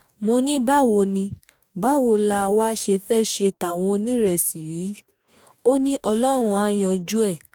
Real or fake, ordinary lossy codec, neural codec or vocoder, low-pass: fake; none; codec, 44.1 kHz, 2.6 kbps, DAC; 19.8 kHz